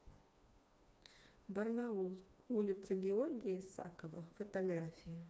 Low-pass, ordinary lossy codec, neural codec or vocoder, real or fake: none; none; codec, 16 kHz, 2 kbps, FreqCodec, smaller model; fake